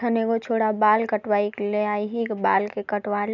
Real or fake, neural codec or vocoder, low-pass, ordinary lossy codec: real; none; 7.2 kHz; none